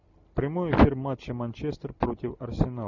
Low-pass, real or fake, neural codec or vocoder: 7.2 kHz; real; none